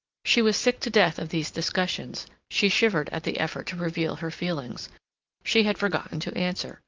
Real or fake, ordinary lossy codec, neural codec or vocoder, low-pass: real; Opus, 32 kbps; none; 7.2 kHz